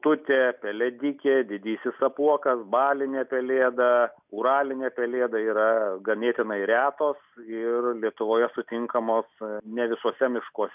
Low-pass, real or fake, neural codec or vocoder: 3.6 kHz; real; none